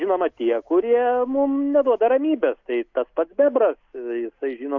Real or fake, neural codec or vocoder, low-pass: real; none; 7.2 kHz